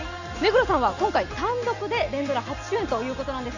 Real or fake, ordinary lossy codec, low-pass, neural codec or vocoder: real; none; 7.2 kHz; none